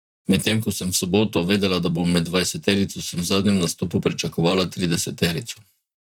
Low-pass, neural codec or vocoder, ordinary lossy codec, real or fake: 19.8 kHz; vocoder, 44.1 kHz, 128 mel bands, Pupu-Vocoder; none; fake